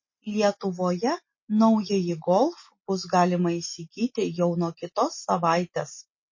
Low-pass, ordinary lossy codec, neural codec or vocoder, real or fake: 7.2 kHz; MP3, 32 kbps; none; real